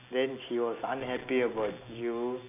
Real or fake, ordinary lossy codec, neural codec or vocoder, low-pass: real; none; none; 3.6 kHz